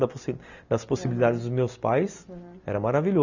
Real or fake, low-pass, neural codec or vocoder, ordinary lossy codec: real; 7.2 kHz; none; none